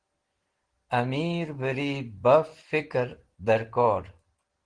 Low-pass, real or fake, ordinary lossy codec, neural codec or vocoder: 9.9 kHz; real; Opus, 16 kbps; none